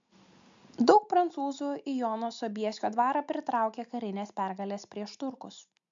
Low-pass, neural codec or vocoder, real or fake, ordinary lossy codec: 7.2 kHz; none; real; MP3, 64 kbps